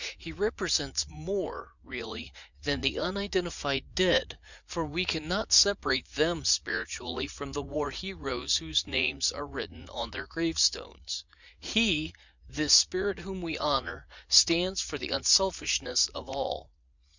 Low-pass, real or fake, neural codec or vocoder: 7.2 kHz; fake; vocoder, 44.1 kHz, 80 mel bands, Vocos